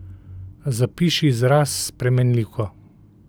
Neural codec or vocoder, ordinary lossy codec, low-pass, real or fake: none; none; none; real